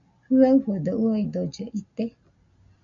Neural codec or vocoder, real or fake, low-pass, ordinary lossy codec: none; real; 7.2 kHz; MP3, 64 kbps